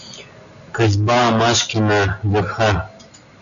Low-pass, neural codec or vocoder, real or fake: 7.2 kHz; none; real